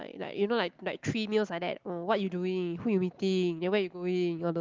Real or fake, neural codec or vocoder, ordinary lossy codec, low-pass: real; none; Opus, 32 kbps; 7.2 kHz